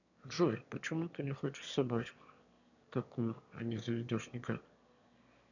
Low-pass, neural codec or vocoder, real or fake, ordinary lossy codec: 7.2 kHz; autoencoder, 22.05 kHz, a latent of 192 numbers a frame, VITS, trained on one speaker; fake; none